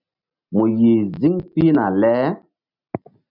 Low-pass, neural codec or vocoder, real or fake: 5.4 kHz; none; real